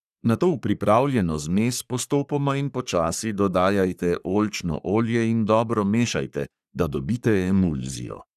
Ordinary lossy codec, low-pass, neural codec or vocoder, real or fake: none; 14.4 kHz; codec, 44.1 kHz, 3.4 kbps, Pupu-Codec; fake